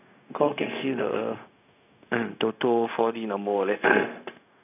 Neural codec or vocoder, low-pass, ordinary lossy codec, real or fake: codec, 16 kHz, 0.4 kbps, LongCat-Audio-Codec; 3.6 kHz; none; fake